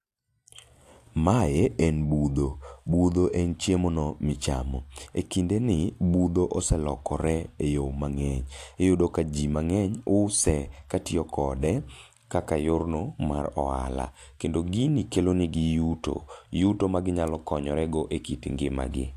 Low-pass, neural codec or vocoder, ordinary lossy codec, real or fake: 14.4 kHz; none; AAC, 64 kbps; real